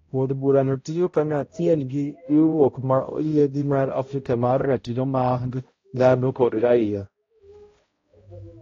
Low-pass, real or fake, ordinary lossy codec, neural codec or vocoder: 7.2 kHz; fake; AAC, 32 kbps; codec, 16 kHz, 0.5 kbps, X-Codec, HuBERT features, trained on balanced general audio